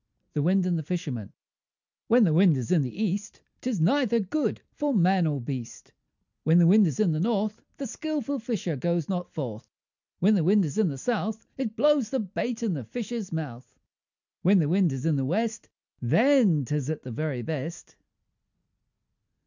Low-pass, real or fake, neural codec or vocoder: 7.2 kHz; real; none